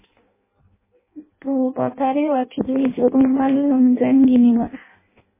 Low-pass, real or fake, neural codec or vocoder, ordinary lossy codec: 3.6 kHz; fake; codec, 16 kHz in and 24 kHz out, 0.6 kbps, FireRedTTS-2 codec; MP3, 16 kbps